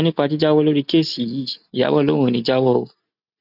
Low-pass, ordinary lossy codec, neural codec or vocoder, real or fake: 5.4 kHz; none; none; real